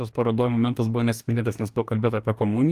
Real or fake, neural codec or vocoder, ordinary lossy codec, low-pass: fake; codec, 44.1 kHz, 2.6 kbps, DAC; Opus, 32 kbps; 14.4 kHz